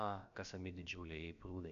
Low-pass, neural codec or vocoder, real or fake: 7.2 kHz; codec, 16 kHz, about 1 kbps, DyCAST, with the encoder's durations; fake